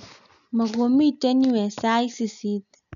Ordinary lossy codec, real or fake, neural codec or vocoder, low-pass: none; real; none; 7.2 kHz